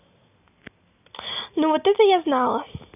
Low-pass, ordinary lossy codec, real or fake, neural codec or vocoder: 3.6 kHz; none; real; none